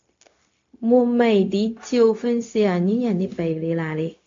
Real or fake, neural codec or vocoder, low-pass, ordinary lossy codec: fake; codec, 16 kHz, 0.4 kbps, LongCat-Audio-Codec; 7.2 kHz; AAC, 48 kbps